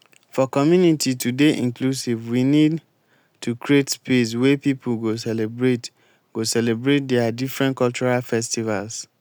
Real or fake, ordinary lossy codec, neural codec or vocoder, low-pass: real; none; none; none